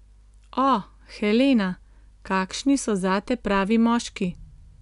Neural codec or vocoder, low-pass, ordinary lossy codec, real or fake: none; 10.8 kHz; none; real